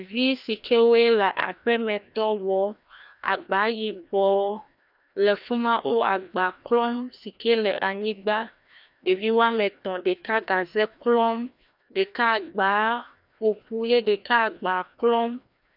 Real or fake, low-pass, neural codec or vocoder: fake; 5.4 kHz; codec, 16 kHz, 1 kbps, FreqCodec, larger model